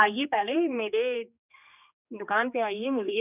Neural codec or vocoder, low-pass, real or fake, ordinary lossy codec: codec, 16 kHz, 2 kbps, X-Codec, HuBERT features, trained on general audio; 3.6 kHz; fake; none